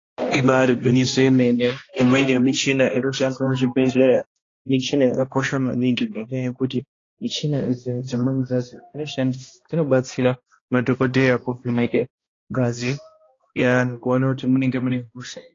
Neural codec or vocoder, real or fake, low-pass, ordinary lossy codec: codec, 16 kHz, 1 kbps, X-Codec, HuBERT features, trained on balanced general audio; fake; 7.2 kHz; AAC, 32 kbps